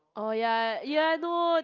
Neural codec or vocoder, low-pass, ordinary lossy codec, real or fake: none; 7.2 kHz; Opus, 24 kbps; real